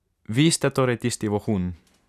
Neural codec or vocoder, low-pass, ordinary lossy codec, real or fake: none; 14.4 kHz; none; real